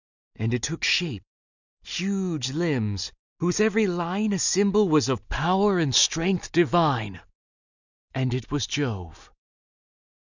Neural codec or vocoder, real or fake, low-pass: none; real; 7.2 kHz